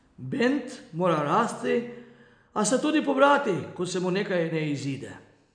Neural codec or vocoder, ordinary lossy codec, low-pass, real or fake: none; MP3, 96 kbps; 9.9 kHz; real